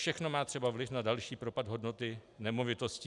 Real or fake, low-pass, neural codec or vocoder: real; 10.8 kHz; none